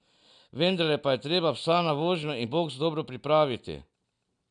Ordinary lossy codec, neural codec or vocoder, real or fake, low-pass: none; none; real; 9.9 kHz